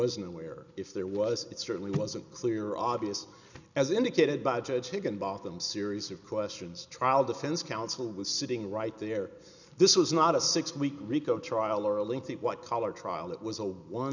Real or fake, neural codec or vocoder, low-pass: real; none; 7.2 kHz